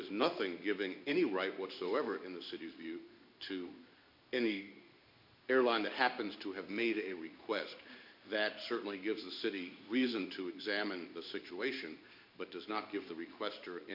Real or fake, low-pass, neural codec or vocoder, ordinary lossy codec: fake; 5.4 kHz; codec, 16 kHz in and 24 kHz out, 1 kbps, XY-Tokenizer; MP3, 48 kbps